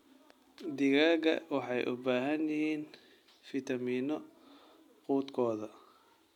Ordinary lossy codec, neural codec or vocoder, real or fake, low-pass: none; none; real; 19.8 kHz